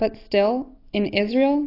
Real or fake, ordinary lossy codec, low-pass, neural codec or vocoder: real; AAC, 24 kbps; 5.4 kHz; none